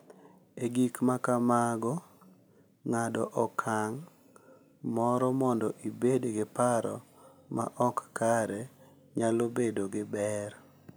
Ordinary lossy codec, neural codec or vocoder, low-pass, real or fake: none; none; none; real